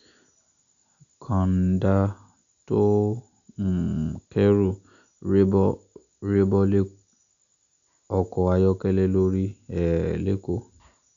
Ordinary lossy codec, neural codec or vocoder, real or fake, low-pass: none; none; real; 7.2 kHz